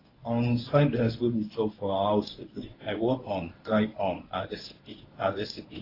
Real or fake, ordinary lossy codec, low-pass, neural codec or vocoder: fake; AAC, 24 kbps; 5.4 kHz; codec, 24 kHz, 0.9 kbps, WavTokenizer, medium speech release version 1